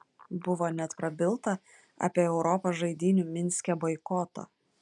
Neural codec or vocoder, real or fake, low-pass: none; real; 10.8 kHz